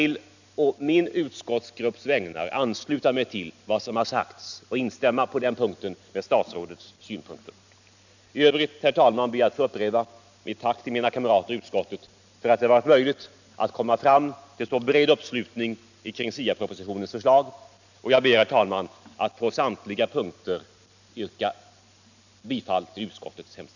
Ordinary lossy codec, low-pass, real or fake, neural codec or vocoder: none; 7.2 kHz; real; none